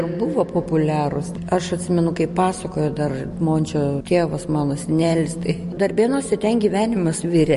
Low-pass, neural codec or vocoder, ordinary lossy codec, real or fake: 14.4 kHz; none; MP3, 48 kbps; real